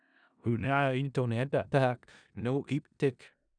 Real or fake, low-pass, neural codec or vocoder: fake; 9.9 kHz; codec, 16 kHz in and 24 kHz out, 0.4 kbps, LongCat-Audio-Codec, four codebook decoder